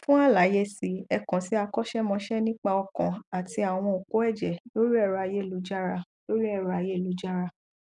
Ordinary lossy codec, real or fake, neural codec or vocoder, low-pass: none; real; none; 10.8 kHz